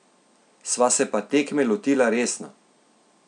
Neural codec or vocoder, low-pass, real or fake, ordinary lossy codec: none; 9.9 kHz; real; none